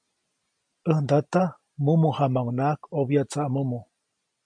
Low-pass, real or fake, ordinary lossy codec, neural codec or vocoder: 9.9 kHz; real; MP3, 64 kbps; none